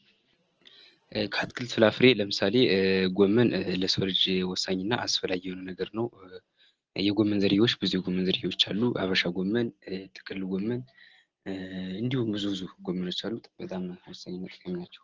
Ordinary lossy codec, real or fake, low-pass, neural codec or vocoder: Opus, 16 kbps; real; 7.2 kHz; none